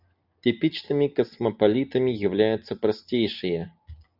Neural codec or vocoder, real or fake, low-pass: none; real; 5.4 kHz